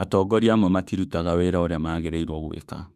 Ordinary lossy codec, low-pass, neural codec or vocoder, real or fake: none; 14.4 kHz; autoencoder, 48 kHz, 32 numbers a frame, DAC-VAE, trained on Japanese speech; fake